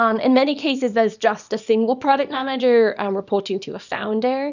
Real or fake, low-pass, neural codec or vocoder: fake; 7.2 kHz; codec, 24 kHz, 0.9 kbps, WavTokenizer, small release